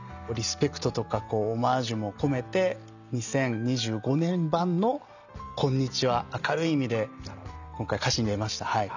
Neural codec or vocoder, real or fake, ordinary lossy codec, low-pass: none; real; none; 7.2 kHz